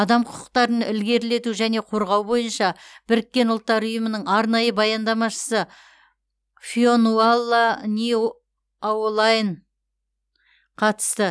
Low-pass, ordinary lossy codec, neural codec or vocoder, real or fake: none; none; none; real